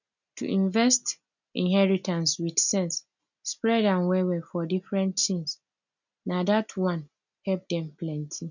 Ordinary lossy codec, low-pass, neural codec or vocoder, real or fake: none; 7.2 kHz; none; real